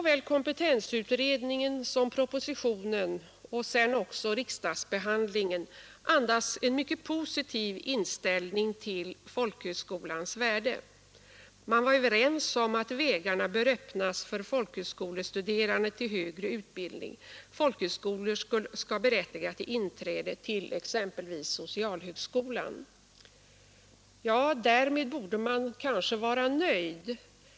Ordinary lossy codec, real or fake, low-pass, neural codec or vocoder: none; real; none; none